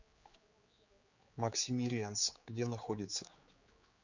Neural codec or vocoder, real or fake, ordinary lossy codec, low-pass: codec, 16 kHz, 4 kbps, X-Codec, HuBERT features, trained on general audio; fake; Opus, 64 kbps; 7.2 kHz